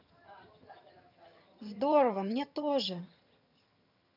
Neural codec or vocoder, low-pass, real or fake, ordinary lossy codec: vocoder, 22.05 kHz, 80 mel bands, HiFi-GAN; 5.4 kHz; fake; none